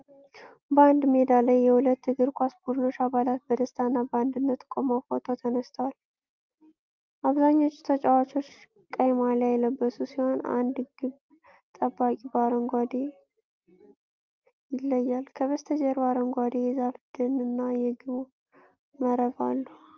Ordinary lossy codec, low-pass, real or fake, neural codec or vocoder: Opus, 24 kbps; 7.2 kHz; real; none